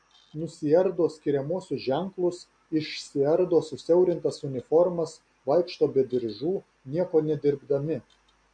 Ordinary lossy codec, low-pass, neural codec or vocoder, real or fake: MP3, 48 kbps; 9.9 kHz; none; real